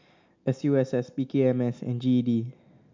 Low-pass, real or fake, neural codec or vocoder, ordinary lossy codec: 7.2 kHz; real; none; MP3, 64 kbps